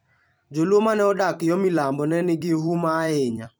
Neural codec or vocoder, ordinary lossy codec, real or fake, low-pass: vocoder, 44.1 kHz, 128 mel bands every 256 samples, BigVGAN v2; none; fake; none